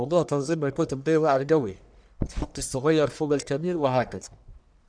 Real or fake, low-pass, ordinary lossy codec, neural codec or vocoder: fake; 9.9 kHz; none; codec, 44.1 kHz, 1.7 kbps, Pupu-Codec